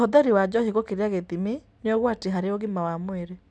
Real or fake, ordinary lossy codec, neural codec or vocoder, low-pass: real; none; none; none